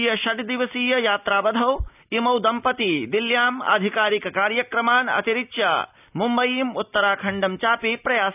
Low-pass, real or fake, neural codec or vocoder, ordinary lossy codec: 3.6 kHz; real; none; none